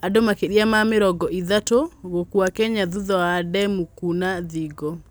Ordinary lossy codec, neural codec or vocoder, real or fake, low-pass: none; none; real; none